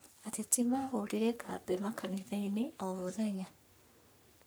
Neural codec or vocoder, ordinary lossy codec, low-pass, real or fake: codec, 44.1 kHz, 3.4 kbps, Pupu-Codec; none; none; fake